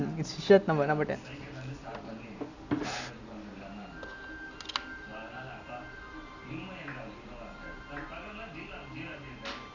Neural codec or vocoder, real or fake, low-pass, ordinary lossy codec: none; real; 7.2 kHz; none